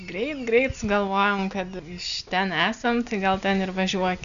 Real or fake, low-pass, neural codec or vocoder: real; 7.2 kHz; none